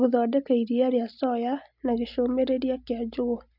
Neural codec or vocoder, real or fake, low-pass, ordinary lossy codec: none; real; 5.4 kHz; none